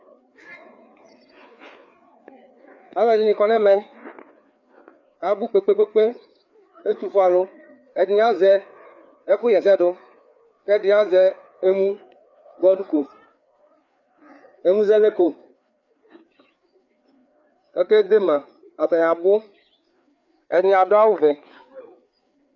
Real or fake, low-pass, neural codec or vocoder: fake; 7.2 kHz; codec, 16 kHz, 4 kbps, FreqCodec, larger model